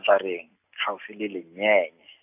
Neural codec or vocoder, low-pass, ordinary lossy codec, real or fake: none; 3.6 kHz; AAC, 32 kbps; real